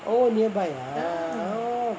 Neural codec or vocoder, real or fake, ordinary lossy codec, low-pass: none; real; none; none